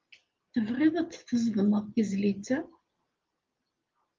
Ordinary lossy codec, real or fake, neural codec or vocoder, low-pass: Opus, 24 kbps; real; none; 7.2 kHz